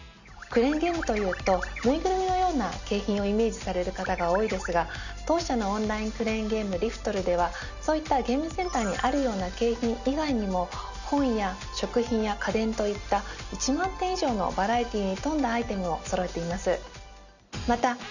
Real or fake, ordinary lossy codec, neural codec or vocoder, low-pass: real; none; none; 7.2 kHz